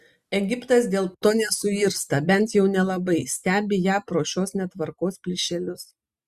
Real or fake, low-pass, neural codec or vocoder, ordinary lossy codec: real; 14.4 kHz; none; Opus, 64 kbps